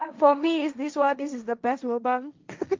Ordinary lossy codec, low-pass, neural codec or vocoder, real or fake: Opus, 32 kbps; 7.2 kHz; codec, 16 kHz, 1.1 kbps, Voila-Tokenizer; fake